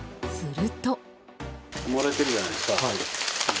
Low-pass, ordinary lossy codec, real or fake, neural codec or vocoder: none; none; real; none